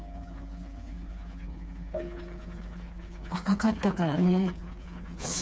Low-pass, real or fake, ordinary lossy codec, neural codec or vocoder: none; fake; none; codec, 16 kHz, 4 kbps, FreqCodec, smaller model